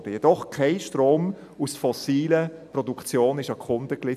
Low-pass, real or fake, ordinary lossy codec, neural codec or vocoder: 14.4 kHz; real; none; none